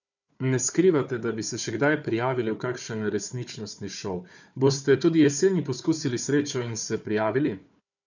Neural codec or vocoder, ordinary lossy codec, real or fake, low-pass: codec, 16 kHz, 4 kbps, FunCodec, trained on Chinese and English, 50 frames a second; none; fake; 7.2 kHz